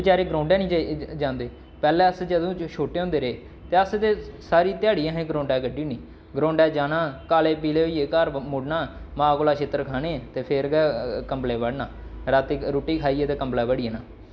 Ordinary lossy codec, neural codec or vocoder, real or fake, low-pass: none; none; real; none